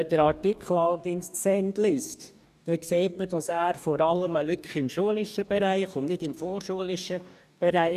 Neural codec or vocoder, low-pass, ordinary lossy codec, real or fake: codec, 44.1 kHz, 2.6 kbps, DAC; 14.4 kHz; none; fake